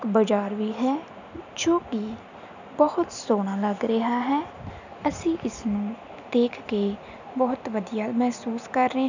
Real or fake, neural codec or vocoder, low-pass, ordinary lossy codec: real; none; 7.2 kHz; none